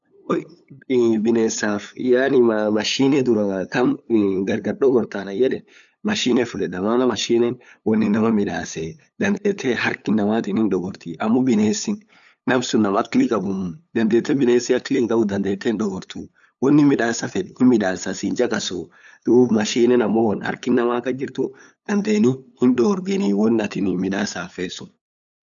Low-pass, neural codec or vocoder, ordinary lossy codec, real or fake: 7.2 kHz; codec, 16 kHz, 8 kbps, FunCodec, trained on LibriTTS, 25 frames a second; none; fake